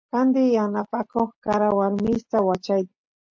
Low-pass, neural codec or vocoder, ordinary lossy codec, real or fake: 7.2 kHz; none; MP3, 48 kbps; real